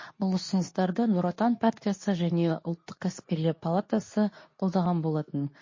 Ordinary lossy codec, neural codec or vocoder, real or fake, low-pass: MP3, 32 kbps; codec, 24 kHz, 0.9 kbps, WavTokenizer, medium speech release version 2; fake; 7.2 kHz